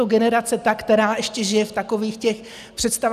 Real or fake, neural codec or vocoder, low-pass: real; none; 14.4 kHz